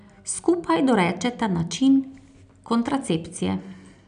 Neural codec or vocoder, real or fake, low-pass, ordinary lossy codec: none; real; 9.9 kHz; none